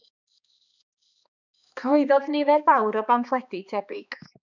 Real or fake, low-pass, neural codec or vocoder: fake; 7.2 kHz; codec, 16 kHz, 2 kbps, X-Codec, HuBERT features, trained on balanced general audio